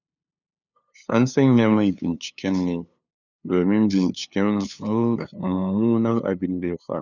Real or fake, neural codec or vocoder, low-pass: fake; codec, 16 kHz, 2 kbps, FunCodec, trained on LibriTTS, 25 frames a second; 7.2 kHz